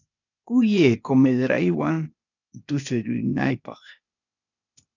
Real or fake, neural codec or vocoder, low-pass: fake; codec, 16 kHz, 0.8 kbps, ZipCodec; 7.2 kHz